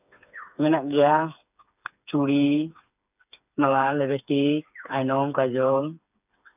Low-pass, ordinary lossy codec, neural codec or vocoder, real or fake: 3.6 kHz; none; codec, 16 kHz, 4 kbps, FreqCodec, smaller model; fake